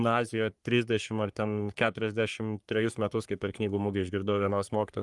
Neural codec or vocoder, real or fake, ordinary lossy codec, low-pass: codec, 44.1 kHz, 3.4 kbps, Pupu-Codec; fake; Opus, 32 kbps; 10.8 kHz